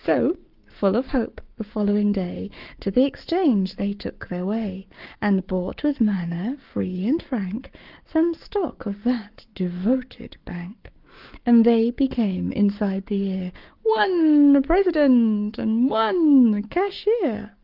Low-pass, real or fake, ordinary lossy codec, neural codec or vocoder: 5.4 kHz; fake; Opus, 24 kbps; codec, 44.1 kHz, 7.8 kbps, Pupu-Codec